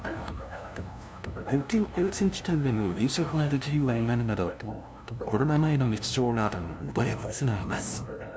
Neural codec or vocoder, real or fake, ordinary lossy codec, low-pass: codec, 16 kHz, 0.5 kbps, FunCodec, trained on LibriTTS, 25 frames a second; fake; none; none